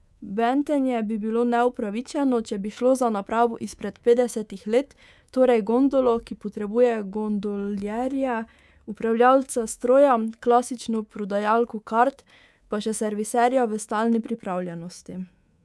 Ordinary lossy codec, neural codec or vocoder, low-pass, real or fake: none; codec, 24 kHz, 3.1 kbps, DualCodec; none; fake